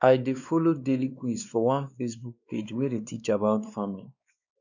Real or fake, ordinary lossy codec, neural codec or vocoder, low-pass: fake; none; codec, 16 kHz, 2 kbps, X-Codec, WavLM features, trained on Multilingual LibriSpeech; 7.2 kHz